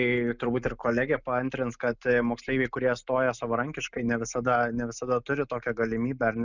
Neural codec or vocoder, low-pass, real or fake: none; 7.2 kHz; real